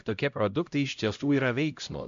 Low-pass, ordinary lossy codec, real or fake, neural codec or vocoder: 7.2 kHz; MP3, 64 kbps; fake; codec, 16 kHz, 0.5 kbps, X-Codec, HuBERT features, trained on LibriSpeech